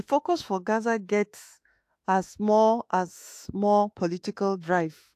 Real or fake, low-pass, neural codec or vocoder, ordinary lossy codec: fake; 14.4 kHz; autoencoder, 48 kHz, 32 numbers a frame, DAC-VAE, trained on Japanese speech; AAC, 64 kbps